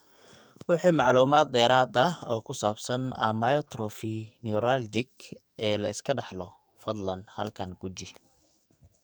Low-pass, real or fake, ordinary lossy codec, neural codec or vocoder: none; fake; none; codec, 44.1 kHz, 2.6 kbps, SNAC